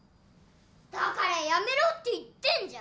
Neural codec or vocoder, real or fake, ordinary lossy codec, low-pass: none; real; none; none